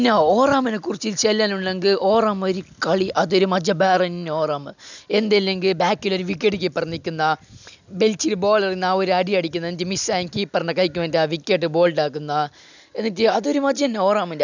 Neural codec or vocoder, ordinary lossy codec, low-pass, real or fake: none; none; 7.2 kHz; real